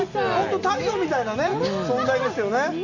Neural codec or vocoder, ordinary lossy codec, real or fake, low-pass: none; none; real; 7.2 kHz